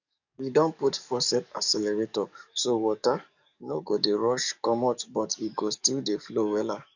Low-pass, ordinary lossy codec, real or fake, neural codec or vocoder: 7.2 kHz; none; fake; codec, 44.1 kHz, 7.8 kbps, DAC